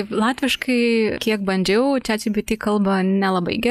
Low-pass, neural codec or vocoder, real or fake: 14.4 kHz; none; real